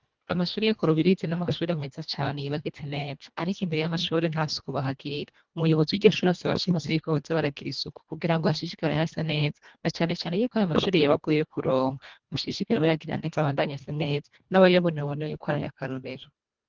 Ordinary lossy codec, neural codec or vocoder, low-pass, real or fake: Opus, 32 kbps; codec, 24 kHz, 1.5 kbps, HILCodec; 7.2 kHz; fake